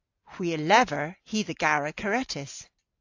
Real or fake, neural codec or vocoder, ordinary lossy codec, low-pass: real; none; AAC, 48 kbps; 7.2 kHz